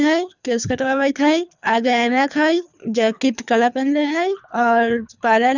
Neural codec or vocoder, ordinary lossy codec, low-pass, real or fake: codec, 24 kHz, 3 kbps, HILCodec; none; 7.2 kHz; fake